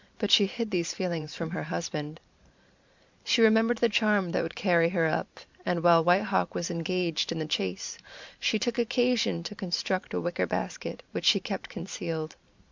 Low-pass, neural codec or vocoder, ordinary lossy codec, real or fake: 7.2 kHz; vocoder, 44.1 kHz, 128 mel bands every 512 samples, BigVGAN v2; MP3, 64 kbps; fake